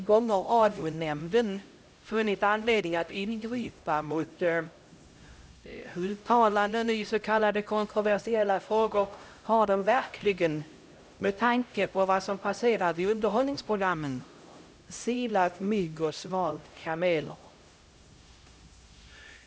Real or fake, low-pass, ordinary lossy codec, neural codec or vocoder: fake; none; none; codec, 16 kHz, 0.5 kbps, X-Codec, HuBERT features, trained on LibriSpeech